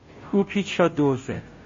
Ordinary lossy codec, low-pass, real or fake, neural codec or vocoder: MP3, 32 kbps; 7.2 kHz; fake; codec, 16 kHz, 0.5 kbps, FunCodec, trained on Chinese and English, 25 frames a second